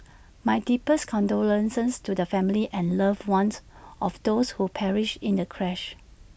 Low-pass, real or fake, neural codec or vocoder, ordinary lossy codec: none; real; none; none